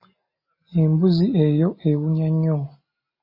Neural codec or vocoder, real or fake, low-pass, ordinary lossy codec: none; real; 5.4 kHz; MP3, 24 kbps